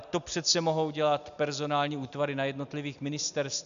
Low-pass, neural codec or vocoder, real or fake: 7.2 kHz; none; real